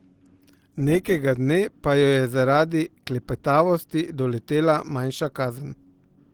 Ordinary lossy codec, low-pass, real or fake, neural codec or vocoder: Opus, 16 kbps; 19.8 kHz; real; none